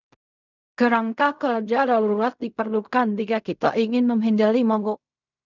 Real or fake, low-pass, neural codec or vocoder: fake; 7.2 kHz; codec, 16 kHz in and 24 kHz out, 0.4 kbps, LongCat-Audio-Codec, fine tuned four codebook decoder